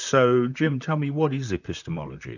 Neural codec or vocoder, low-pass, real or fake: vocoder, 44.1 kHz, 128 mel bands, Pupu-Vocoder; 7.2 kHz; fake